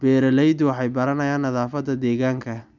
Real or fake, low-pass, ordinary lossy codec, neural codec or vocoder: real; 7.2 kHz; none; none